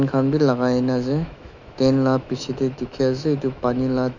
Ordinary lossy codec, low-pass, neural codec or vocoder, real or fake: none; 7.2 kHz; autoencoder, 48 kHz, 128 numbers a frame, DAC-VAE, trained on Japanese speech; fake